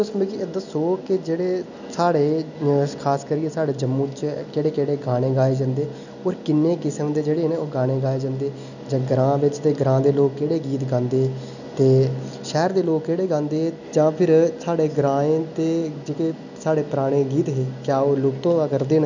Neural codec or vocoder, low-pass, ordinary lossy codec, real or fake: none; 7.2 kHz; none; real